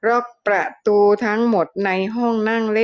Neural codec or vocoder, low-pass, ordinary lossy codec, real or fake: none; none; none; real